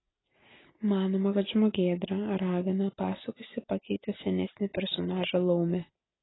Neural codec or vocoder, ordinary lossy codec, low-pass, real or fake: none; AAC, 16 kbps; 7.2 kHz; real